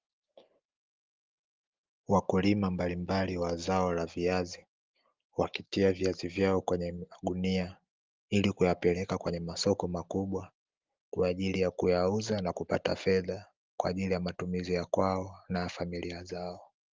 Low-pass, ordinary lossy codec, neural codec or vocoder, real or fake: 7.2 kHz; Opus, 32 kbps; none; real